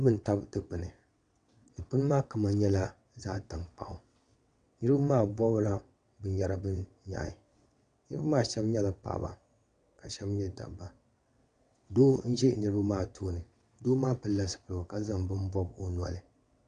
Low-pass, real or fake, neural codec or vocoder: 9.9 kHz; fake; vocoder, 22.05 kHz, 80 mel bands, WaveNeXt